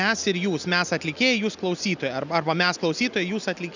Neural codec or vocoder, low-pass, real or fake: none; 7.2 kHz; real